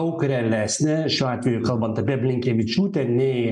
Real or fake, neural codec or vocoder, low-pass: real; none; 10.8 kHz